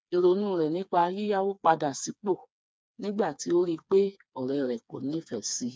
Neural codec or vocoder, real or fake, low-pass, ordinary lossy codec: codec, 16 kHz, 4 kbps, FreqCodec, smaller model; fake; none; none